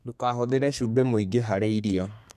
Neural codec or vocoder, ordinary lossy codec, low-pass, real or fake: codec, 32 kHz, 1.9 kbps, SNAC; none; 14.4 kHz; fake